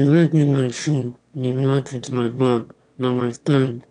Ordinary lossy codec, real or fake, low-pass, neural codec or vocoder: none; fake; 9.9 kHz; autoencoder, 22.05 kHz, a latent of 192 numbers a frame, VITS, trained on one speaker